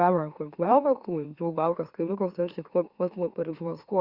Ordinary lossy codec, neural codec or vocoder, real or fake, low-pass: Opus, 64 kbps; autoencoder, 44.1 kHz, a latent of 192 numbers a frame, MeloTTS; fake; 5.4 kHz